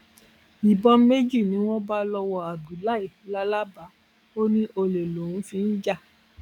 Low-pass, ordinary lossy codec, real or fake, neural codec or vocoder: 19.8 kHz; none; fake; codec, 44.1 kHz, 7.8 kbps, DAC